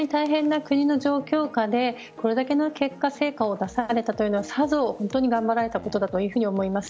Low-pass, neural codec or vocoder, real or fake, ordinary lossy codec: none; none; real; none